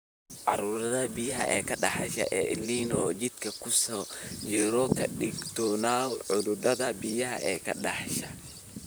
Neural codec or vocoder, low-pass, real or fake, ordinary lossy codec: vocoder, 44.1 kHz, 128 mel bands, Pupu-Vocoder; none; fake; none